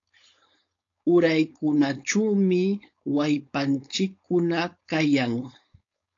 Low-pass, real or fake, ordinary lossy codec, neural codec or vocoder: 7.2 kHz; fake; AAC, 48 kbps; codec, 16 kHz, 4.8 kbps, FACodec